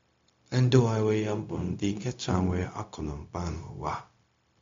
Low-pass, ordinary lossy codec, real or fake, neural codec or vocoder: 7.2 kHz; MP3, 48 kbps; fake; codec, 16 kHz, 0.4 kbps, LongCat-Audio-Codec